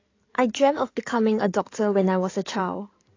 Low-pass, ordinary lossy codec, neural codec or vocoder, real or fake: 7.2 kHz; AAC, 32 kbps; codec, 16 kHz in and 24 kHz out, 2.2 kbps, FireRedTTS-2 codec; fake